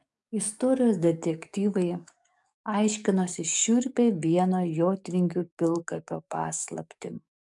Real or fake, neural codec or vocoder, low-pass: fake; codec, 44.1 kHz, 7.8 kbps, DAC; 10.8 kHz